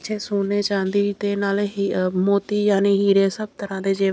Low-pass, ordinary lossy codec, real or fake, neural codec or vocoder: none; none; real; none